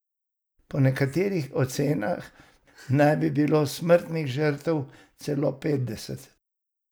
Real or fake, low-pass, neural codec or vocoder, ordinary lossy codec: real; none; none; none